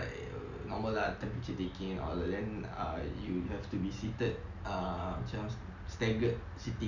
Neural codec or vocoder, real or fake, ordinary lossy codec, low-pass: none; real; Opus, 64 kbps; 7.2 kHz